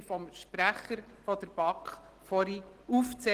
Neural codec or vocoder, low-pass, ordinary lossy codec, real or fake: none; 14.4 kHz; Opus, 16 kbps; real